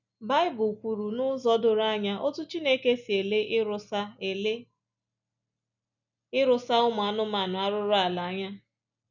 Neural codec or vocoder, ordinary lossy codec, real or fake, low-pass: none; none; real; 7.2 kHz